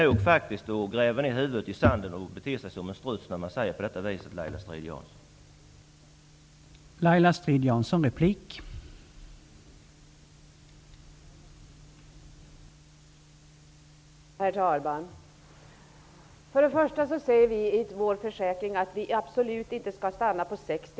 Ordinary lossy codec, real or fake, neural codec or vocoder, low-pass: none; real; none; none